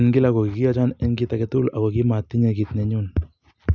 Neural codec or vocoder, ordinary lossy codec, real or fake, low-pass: none; none; real; none